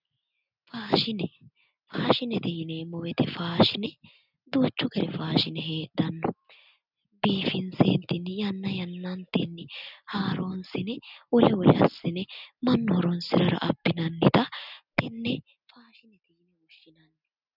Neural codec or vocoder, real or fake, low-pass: none; real; 5.4 kHz